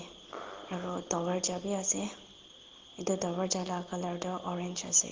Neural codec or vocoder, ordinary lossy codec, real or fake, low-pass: none; Opus, 16 kbps; real; 7.2 kHz